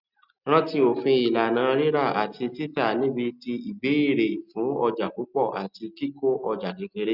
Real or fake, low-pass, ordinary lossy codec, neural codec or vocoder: real; 5.4 kHz; none; none